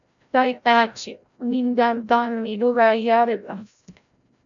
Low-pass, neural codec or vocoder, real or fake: 7.2 kHz; codec, 16 kHz, 0.5 kbps, FreqCodec, larger model; fake